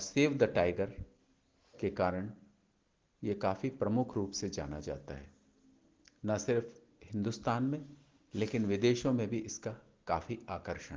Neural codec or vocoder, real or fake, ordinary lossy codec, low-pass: none; real; Opus, 16 kbps; 7.2 kHz